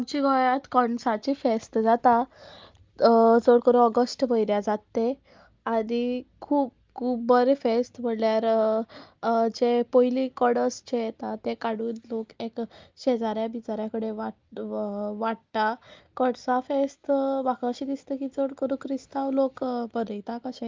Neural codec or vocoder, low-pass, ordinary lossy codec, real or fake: none; 7.2 kHz; Opus, 24 kbps; real